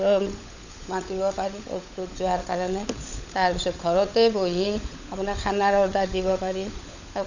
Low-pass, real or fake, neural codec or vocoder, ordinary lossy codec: 7.2 kHz; fake; codec, 16 kHz, 16 kbps, FunCodec, trained on LibriTTS, 50 frames a second; none